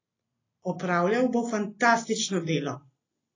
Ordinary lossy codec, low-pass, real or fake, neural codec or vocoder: AAC, 32 kbps; 7.2 kHz; real; none